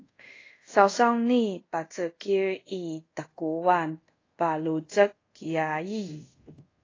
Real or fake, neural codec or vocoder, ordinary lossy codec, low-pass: fake; codec, 24 kHz, 0.5 kbps, DualCodec; AAC, 32 kbps; 7.2 kHz